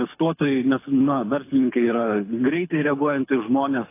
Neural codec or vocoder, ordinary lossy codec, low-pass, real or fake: codec, 24 kHz, 6 kbps, HILCodec; AAC, 24 kbps; 3.6 kHz; fake